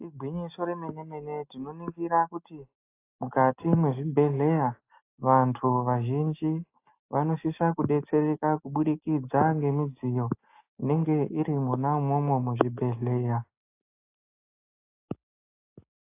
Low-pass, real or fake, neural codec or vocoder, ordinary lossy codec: 3.6 kHz; real; none; AAC, 24 kbps